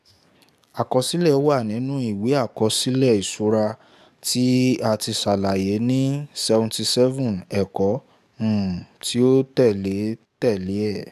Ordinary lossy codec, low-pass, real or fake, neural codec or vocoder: none; 14.4 kHz; fake; codec, 44.1 kHz, 7.8 kbps, DAC